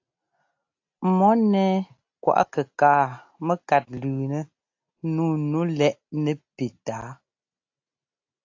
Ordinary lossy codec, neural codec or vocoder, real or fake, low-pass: AAC, 48 kbps; none; real; 7.2 kHz